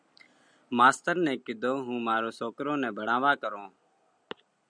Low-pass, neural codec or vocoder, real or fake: 9.9 kHz; none; real